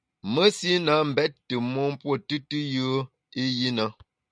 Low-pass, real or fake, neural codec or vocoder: 9.9 kHz; real; none